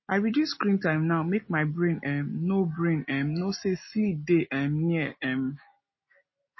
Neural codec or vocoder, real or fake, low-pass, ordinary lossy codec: none; real; 7.2 kHz; MP3, 24 kbps